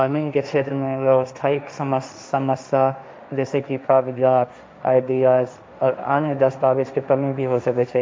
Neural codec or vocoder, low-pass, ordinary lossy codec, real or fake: codec, 16 kHz, 1.1 kbps, Voila-Tokenizer; none; none; fake